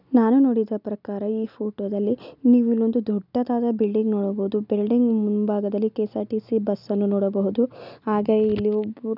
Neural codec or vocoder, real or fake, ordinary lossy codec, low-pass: none; real; none; 5.4 kHz